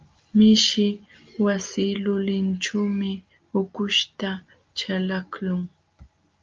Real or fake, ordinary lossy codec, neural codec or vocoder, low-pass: real; Opus, 32 kbps; none; 7.2 kHz